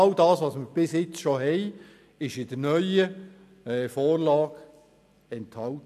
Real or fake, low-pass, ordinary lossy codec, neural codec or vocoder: real; 14.4 kHz; none; none